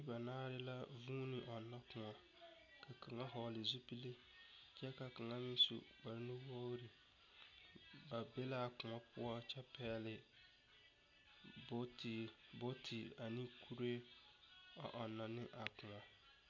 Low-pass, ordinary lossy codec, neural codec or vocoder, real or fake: 7.2 kHz; MP3, 64 kbps; none; real